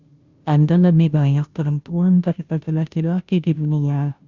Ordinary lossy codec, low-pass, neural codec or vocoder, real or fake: Opus, 64 kbps; 7.2 kHz; codec, 16 kHz, 0.5 kbps, FunCodec, trained on Chinese and English, 25 frames a second; fake